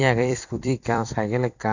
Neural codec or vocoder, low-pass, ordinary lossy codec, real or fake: vocoder, 44.1 kHz, 128 mel bands every 256 samples, BigVGAN v2; 7.2 kHz; none; fake